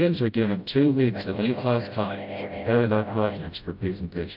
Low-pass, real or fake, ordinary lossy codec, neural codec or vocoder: 5.4 kHz; fake; AAC, 48 kbps; codec, 16 kHz, 0.5 kbps, FreqCodec, smaller model